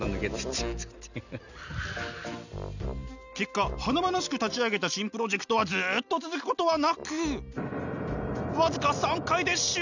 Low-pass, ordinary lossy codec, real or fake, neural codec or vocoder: 7.2 kHz; none; real; none